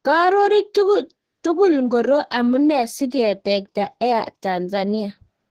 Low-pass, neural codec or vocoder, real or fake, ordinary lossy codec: 14.4 kHz; codec, 32 kHz, 1.9 kbps, SNAC; fake; Opus, 16 kbps